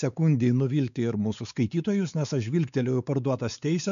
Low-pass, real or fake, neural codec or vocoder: 7.2 kHz; fake; codec, 16 kHz, 4 kbps, X-Codec, WavLM features, trained on Multilingual LibriSpeech